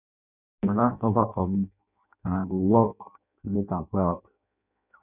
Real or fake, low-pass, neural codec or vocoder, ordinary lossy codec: fake; 3.6 kHz; codec, 16 kHz in and 24 kHz out, 0.6 kbps, FireRedTTS-2 codec; none